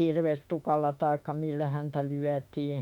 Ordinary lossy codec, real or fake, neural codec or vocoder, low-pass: none; fake; autoencoder, 48 kHz, 32 numbers a frame, DAC-VAE, trained on Japanese speech; 19.8 kHz